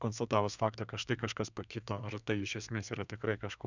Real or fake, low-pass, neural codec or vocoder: fake; 7.2 kHz; codec, 32 kHz, 1.9 kbps, SNAC